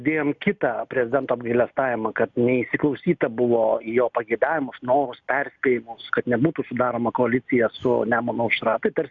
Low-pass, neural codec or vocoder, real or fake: 7.2 kHz; none; real